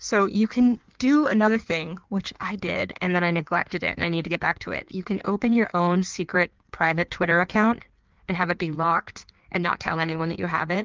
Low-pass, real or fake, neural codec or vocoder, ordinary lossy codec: 7.2 kHz; fake; codec, 16 kHz in and 24 kHz out, 1.1 kbps, FireRedTTS-2 codec; Opus, 24 kbps